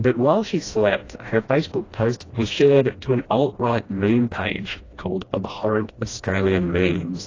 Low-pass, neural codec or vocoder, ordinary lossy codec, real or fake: 7.2 kHz; codec, 16 kHz, 1 kbps, FreqCodec, smaller model; AAC, 32 kbps; fake